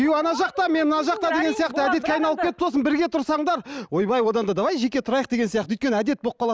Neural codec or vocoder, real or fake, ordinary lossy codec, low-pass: none; real; none; none